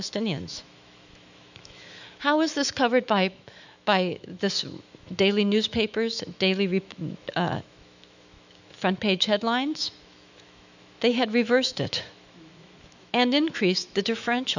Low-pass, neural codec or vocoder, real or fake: 7.2 kHz; autoencoder, 48 kHz, 128 numbers a frame, DAC-VAE, trained on Japanese speech; fake